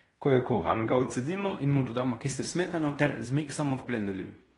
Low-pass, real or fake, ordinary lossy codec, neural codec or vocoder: 10.8 kHz; fake; AAC, 32 kbps; codec, 16 kHz in and 24 kHz out, 0.9 kbps, LongCat-Audio-Codec, fine tuned four codebook decoder